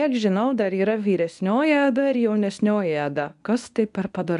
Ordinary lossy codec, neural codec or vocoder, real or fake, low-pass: AAC, 96 kbps; codec, 24 kHz, 0.9 kbps, WavTokenizer, medium speech release version 1; fake; 10.8 kHz